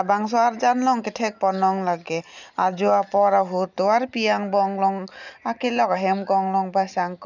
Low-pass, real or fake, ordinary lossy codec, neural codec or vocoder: 7.2 kHz; real; none; none